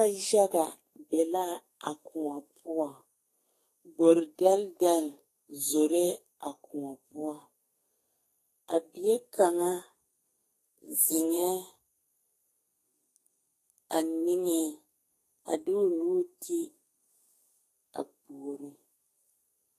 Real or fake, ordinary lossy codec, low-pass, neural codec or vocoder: fake; AAC, 48 kbps; 14.4 kHz; codec, 32 kHz, 1.9 kbps, SNAC